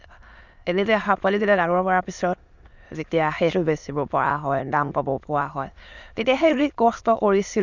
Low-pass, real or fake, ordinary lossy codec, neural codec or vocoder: 7.2 kHz; fake; none; autoencoder, 22.05 kHz, a latent of 192 numbers a frame, VITS, trained on many speakers